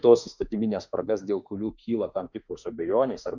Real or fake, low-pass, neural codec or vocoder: fake; 7.2 kHz; autoencoder, 48 kHz, 32 numbers a frame, DAC-VAE, trained on Japanese speech